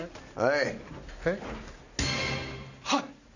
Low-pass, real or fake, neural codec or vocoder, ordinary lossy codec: 7.2 kHz; real; none; none